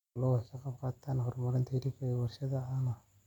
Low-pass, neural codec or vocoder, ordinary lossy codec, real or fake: 19.8 kHz; none; none; real